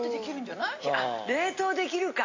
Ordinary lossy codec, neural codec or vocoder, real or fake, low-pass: AAC, 48 kbps; none; real; 7.2 kHz